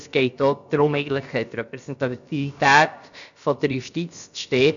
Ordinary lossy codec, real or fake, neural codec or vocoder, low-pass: none; fake; codec, 16 kHz, about 1 kbps, DyCAST, with the encoder's durations; 7.2 kHz